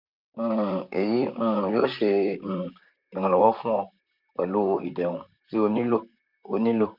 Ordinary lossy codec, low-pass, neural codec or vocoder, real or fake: MP3, 48 kbps; 5.4 kHz; vocoder, 44.1 kHz, 128 mel bands, Pupu-Vocoder; fake